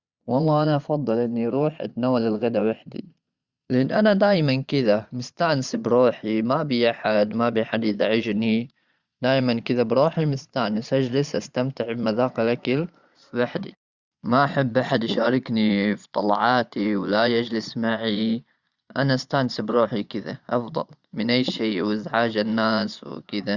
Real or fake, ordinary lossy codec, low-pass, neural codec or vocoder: fake; Opus, 64 kbps; 7.2 kHz; vocoder, 22.05 kHz, 80 mel bands, Vocos